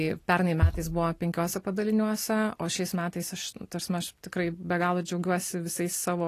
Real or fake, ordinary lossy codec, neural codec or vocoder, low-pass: real; AAC, 48 kbps; none; 14.4 kHz